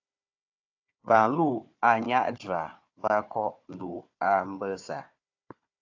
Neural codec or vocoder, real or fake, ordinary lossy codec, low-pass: codec, 16 kHz, 4 kbps, FunCodec, trained on Chinese and English, 50 frames a second; fake; AAC, 48 kbps; 7.2 kHz